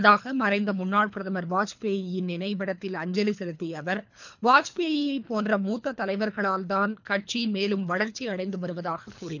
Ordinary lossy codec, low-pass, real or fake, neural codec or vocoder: none; 7.2 kHz; fake; codec, 24 kHz, 3 kbps, HILCodec